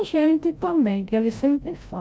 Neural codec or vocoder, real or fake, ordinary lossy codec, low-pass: codec, 16 kHz, 0.5 kbps, FreqCodec, larger model; fake; none; none